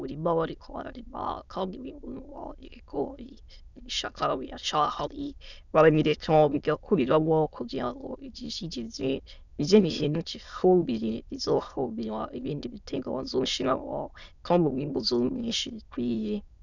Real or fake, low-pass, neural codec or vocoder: fake; 7.2 kHz; autoencoder, 22.05 kHz, a latent of 192 numbers a frame, VITS, trained on many speakers